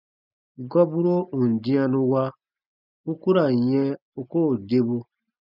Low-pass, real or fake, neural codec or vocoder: 5.4 kHz; real; none